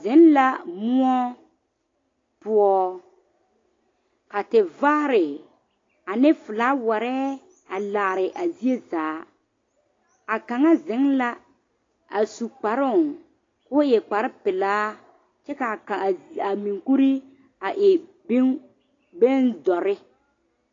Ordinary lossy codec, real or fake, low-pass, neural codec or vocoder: AAC, 32 kbps; real; 7.2 kHz; none